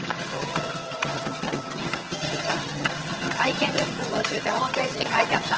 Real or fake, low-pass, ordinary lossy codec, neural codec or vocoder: fake; 7.2 kHz; Opus, 16 kbps; vocoder, 22.05 kHz, 80 mel bands, HiFi-GAN